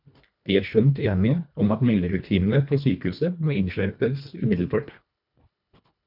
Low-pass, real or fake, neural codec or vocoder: 5.4 kHz; fake; codec, 24 kHz, 1.5 kbps, HILCodec